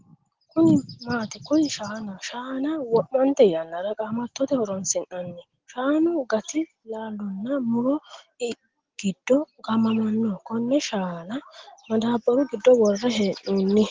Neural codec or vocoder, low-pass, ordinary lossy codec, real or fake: none; 7.2 kHz; Opus, 16 kbps; real